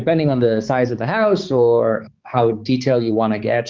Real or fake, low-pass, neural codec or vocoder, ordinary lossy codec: fake; 7.2 kHz; codec, 16 kHz, 4 kbps, X-Codec, HuBERT features, trained on balanced general audio; Opus, 32 kbps